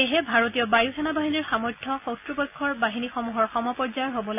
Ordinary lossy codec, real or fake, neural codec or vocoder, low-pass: none; real; none; 3.6 kHz